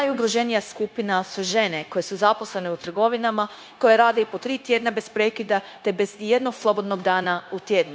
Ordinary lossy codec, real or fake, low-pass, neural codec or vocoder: none; fake; none; codec, 16 kHz, 0.9 kbps, LongCat-Audio-Codec